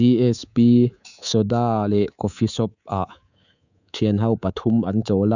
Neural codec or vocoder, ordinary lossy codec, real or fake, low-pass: codec, 24 kHz, 3.1 kbps, DualCodec; none; fake; 7.2 kHz